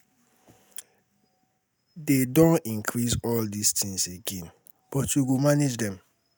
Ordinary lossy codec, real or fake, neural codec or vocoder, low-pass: none; real; none; none